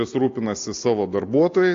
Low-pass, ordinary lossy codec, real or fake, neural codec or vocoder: 7.2 kHz; AAC, 48 kbps; real; none